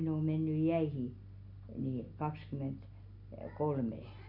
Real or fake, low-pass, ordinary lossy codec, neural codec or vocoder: real; 5.4 kHz; none; none